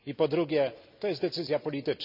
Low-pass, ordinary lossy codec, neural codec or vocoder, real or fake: 5.4 kHz; none; none; real